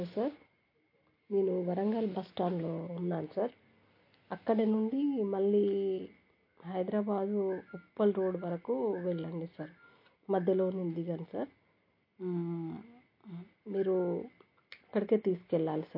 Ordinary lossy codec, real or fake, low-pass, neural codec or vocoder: MP3, 32 kbps; real; 5.4 kHz; none